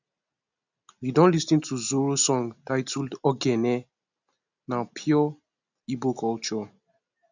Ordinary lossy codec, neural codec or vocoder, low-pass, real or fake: none; none; 7.2 kHz; real